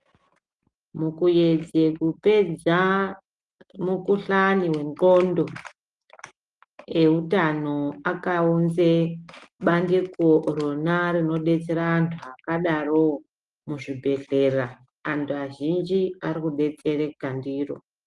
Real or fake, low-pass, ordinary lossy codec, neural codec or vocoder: real; 10.8 kHz; Opus, 24 kbps; none